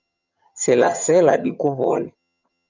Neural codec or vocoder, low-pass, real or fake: vocoder, 22.05 kHz, 80 mel bands, HiFi-GAN; 7.2 kHz; fake